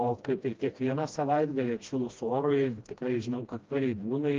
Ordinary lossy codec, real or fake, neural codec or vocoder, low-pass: Opus, 32 kbps; fake; codec, 16 kHz, 1 kbps, FreqCodec, smaller model; 7.2 kHz